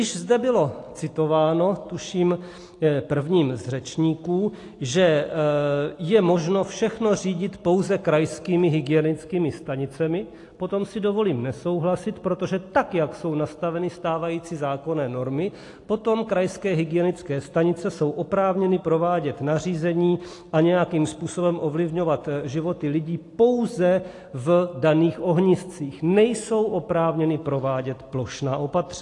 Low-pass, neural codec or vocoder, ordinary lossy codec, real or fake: 10.8 kHz; none; AAC, 48 kbps; real